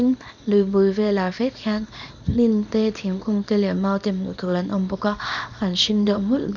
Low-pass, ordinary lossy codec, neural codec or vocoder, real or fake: 7.2 kHz; none; codec, 24 kHz, 0.9 kbps, WavTokenizer, small release; fake